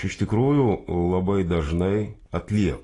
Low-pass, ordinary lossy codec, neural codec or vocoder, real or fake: 10.8 kHz; AAC, 32 kbps; none; real